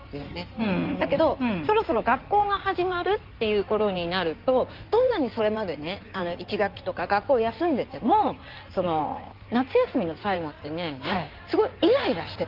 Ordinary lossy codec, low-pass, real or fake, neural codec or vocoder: Opus, 24 kbps; 5.4 kHz; fake; codec, 16 kHz in and 24 kHz out, 2.2 kbps, FireRedTTS-2 codec